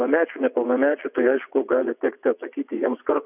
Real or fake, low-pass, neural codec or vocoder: fake; 3.6 kHz; vocoder, 22.05 kHz, 80 mel bands, WaveNeXt